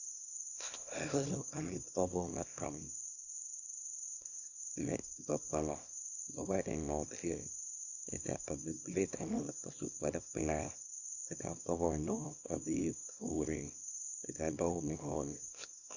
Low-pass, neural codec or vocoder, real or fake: 7.2 kHz; codec, 24 kHz, 0.9 kbps, WavTokenizer, small release; fake